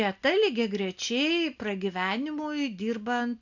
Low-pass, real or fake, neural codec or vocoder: 7.2 kHz; real; none